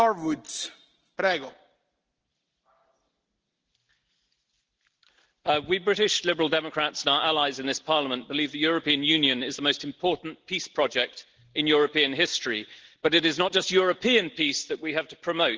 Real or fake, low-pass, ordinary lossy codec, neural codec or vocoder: real; 7.2 kHz; Opus, 16 kbps; none